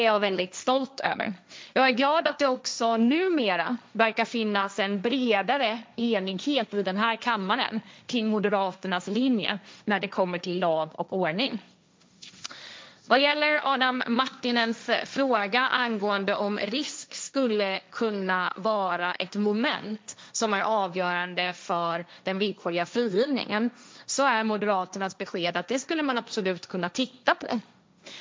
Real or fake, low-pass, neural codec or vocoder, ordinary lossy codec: fake; none; codec, 16 kHz, 1.1 kbps, Voila-Tokenizer; none